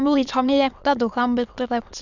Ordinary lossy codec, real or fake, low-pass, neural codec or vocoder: none; fake; 7.2 kHz; autoencoder, 22.05 kHz, a latent of 192 numbers a frame, VITS, trained on many speakers